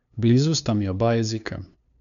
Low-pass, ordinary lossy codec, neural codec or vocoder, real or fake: 7.2 kHz; none; codec, 16 kHz, 2 kbps, FunCodec, trained on LibriTTS, 25 frames a second; fake